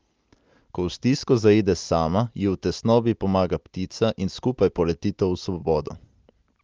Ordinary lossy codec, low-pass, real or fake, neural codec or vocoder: Opus, 24 kbps; 7.2 kHz; real; none